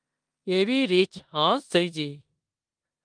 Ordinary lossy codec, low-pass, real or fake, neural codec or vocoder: Opus, 32 kbps; 9.9 kHz; fake; codec, 16 kHz in and 24 kHz out, 0.9 kbps, LongCat-Audio-Codec, four codebook decoder